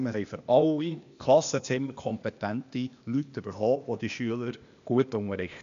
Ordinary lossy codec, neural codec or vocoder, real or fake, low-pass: AAC, 96 kbps; codec, 16 kHz, 0.8 kbps, ZipCodec; fake; 7.2 kHz